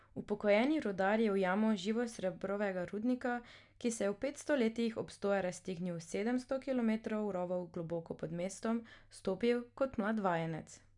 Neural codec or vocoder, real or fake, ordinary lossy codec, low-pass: none; real; none; 10.8 kHz